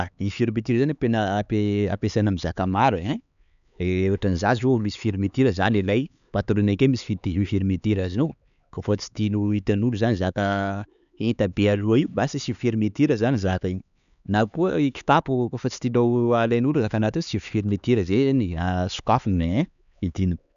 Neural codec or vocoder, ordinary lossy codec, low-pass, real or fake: codec, 16 kHz, 4 kbps, X-Codec, HuBERT features, trained on LibriSpeech; none; 7.2 kHz; fake